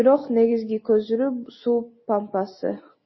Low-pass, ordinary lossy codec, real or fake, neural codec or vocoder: 7.2 kHz; MP3, 24 kbps; real; none